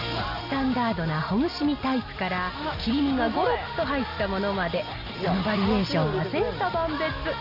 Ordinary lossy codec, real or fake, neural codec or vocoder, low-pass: none; real; none; 5.4 kHz